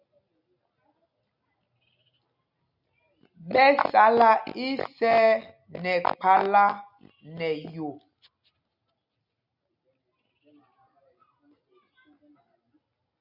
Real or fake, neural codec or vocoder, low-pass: real; none; 5.4 kHz